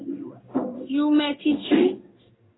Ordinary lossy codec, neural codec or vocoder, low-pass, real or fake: AAC, 16 kbps; codec, 16 kHz in and 24 kHz out, 1 kbps, XY-Tokenizer; 7.2 kHz; fake